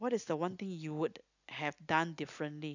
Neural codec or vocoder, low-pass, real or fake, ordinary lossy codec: none; 7.2 kHz; real; none